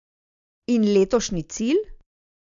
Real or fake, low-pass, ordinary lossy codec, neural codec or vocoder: real; 7.2 kHz; MP3, 64 kbps; none